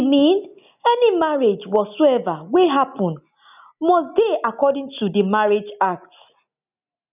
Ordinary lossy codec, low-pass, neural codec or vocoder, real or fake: none; 3.6 kHz; none; real